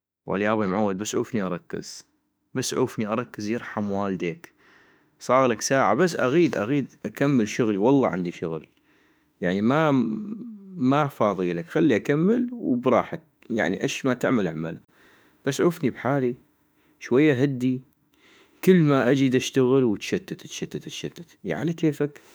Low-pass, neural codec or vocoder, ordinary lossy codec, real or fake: none; autoencoder, 48 kHz, 32 numbers a frame, DAC-VAE, trained on Japanese speech; none; fake